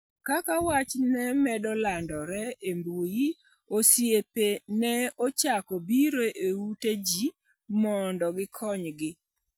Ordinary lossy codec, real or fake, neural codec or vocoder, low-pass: none; real; none; none